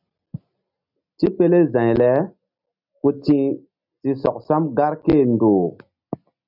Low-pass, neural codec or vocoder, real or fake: 5.4 kHz; none; real